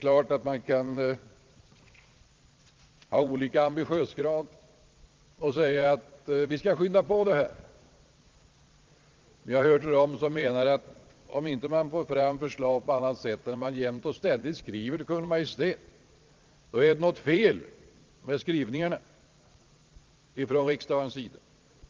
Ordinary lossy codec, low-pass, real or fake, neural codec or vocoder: Opus, 32 kbps; 7.2 kHz; fake; vocoder, 22.05 kHz, 80 mel bands, Vocos